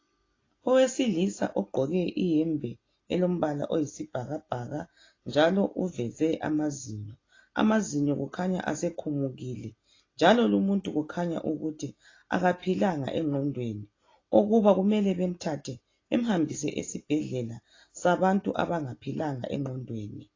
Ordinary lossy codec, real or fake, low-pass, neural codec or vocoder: AAC, 32 kbps; real; 7.2 kHz; none